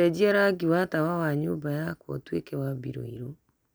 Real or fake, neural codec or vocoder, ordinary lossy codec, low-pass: real; none; none; none